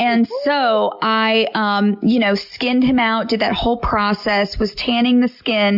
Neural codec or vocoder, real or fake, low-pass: none; real; 5.4 kHz